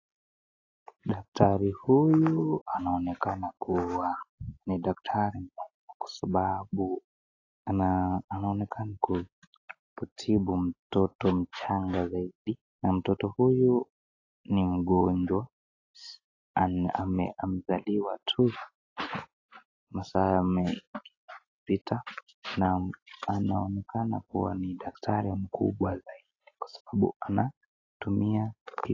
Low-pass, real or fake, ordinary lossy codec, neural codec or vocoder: 7.2 kHz; real; AAC, 32 kbps; none